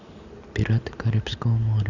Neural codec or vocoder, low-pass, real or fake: none; 7.2 kHz; real